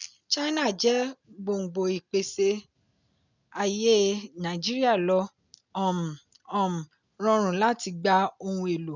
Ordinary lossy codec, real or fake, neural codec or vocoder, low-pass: none; real; none; 7.2 kHz